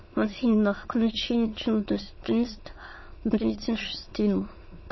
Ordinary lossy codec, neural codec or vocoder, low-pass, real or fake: MP3, 24 kbps; autoencoder, 22.05 kHz, a latent of 192 numbers a frame, VITS, trained on many speakers; 7.2 kHz; fake